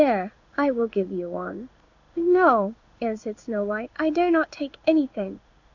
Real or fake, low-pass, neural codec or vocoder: fake; 7.2 kHz; codec, 16 kHz in and 24 kHz out, 1 kbps, XY-Tokenizer